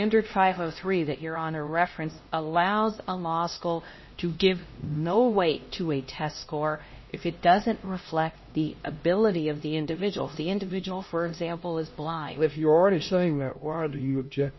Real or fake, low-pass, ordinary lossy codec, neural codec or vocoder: fake; 7.2 kHz; MP3, 24 kbps; codec, 16 kHz, 1 kbps, X-Codec, HuBERT features, trained on LibriSpeech